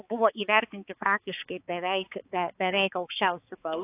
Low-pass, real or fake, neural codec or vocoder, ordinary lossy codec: 3.6 kHz; fake; codec, 16 kHz, 4 kbps, X-Codec, HuBERT features, trained on balanced general audio; AAC, 24 kbps